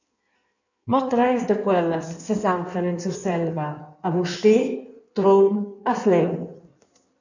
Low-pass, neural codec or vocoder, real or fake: 7.2 kHz; codec, 16 kHz in and 24 kHz out, 1.1 kbps, FireRedTTS-2 codec; fake